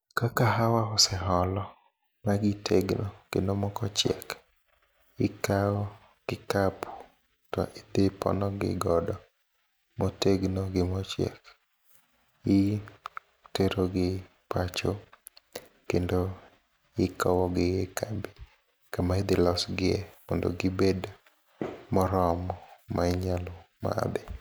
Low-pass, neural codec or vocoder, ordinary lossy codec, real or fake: none; none; none; real